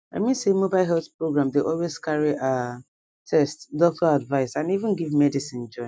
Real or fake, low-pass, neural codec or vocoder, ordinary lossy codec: real; none; none; none